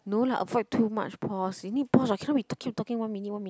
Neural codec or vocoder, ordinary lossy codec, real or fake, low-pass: none; none; real; none